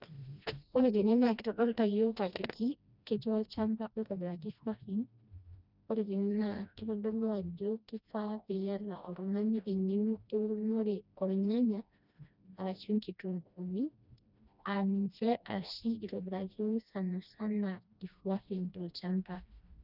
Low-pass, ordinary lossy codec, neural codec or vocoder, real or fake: 5.4 kHz; none; codec, 16 kHz, 1 kbps, FreqCodec, smaller model; fake